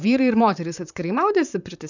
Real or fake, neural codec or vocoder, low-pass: fake; vocoder, 44.1 kHz, 128 mel bands, Pupu-Vocoder; 7.2 kHz